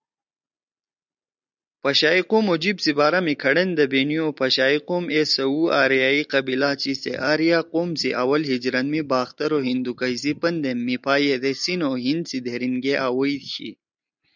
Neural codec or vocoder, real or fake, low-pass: none; real; 7.2 kHz